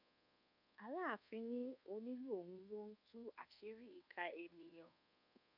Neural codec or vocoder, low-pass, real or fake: codec, 24 kHz, 1.2 kbps, DualCodec; 5.4 kHz; fake